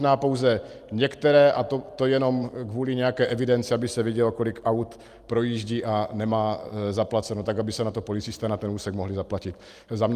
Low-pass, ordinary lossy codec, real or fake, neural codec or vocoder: 14.4 kHz; Opus, 32 kbps; real; none